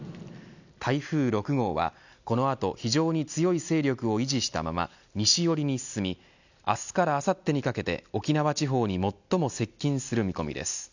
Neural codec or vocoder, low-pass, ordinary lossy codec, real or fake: none; 7.2 kHz; none; real